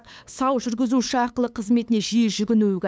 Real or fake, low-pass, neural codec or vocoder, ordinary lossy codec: fake; none; codec, 16 kHz, 8 kbps, FunCodec, trained on LibriTTS, 25 frames a second; none